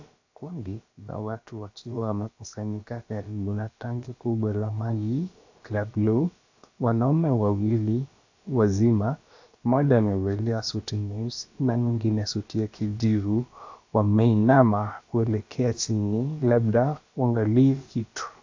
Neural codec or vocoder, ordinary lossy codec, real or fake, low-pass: codec, 16 kHz, about 1 kbps, DyCAST, with the encoder's durations; AAC, 48 kbps; fake; 7.2 kHz